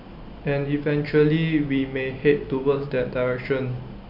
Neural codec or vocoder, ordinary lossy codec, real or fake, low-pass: none; MP3, 48 kbps; real; 5.4 kHz